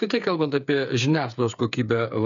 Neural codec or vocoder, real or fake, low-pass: codec, 16 kHz, 8 kbps, FreqCodec, smaller model; fake; 7.2 kHz